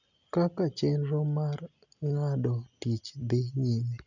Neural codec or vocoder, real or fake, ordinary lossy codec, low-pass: none; real; none; 7.2 kHz